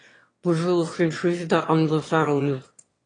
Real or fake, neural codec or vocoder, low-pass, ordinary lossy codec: fake; autoencoder, 22.05 kHz, a latent of 192 numbers a frame, VITS, trained on one speaker; 9.9 kHz; AAC, 32 kbps